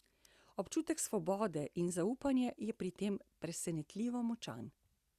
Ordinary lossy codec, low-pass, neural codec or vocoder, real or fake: Opus, 64 kbps; 14.4 kHz; none; real